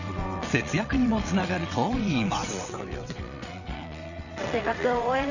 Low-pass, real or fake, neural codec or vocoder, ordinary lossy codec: 7.2 kHz; fake; vocoder, 22.05 kHz, 80 mel bands, WaveNeXt; none